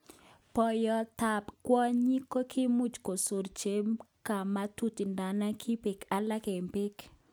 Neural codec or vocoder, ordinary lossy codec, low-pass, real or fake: none; none; none; real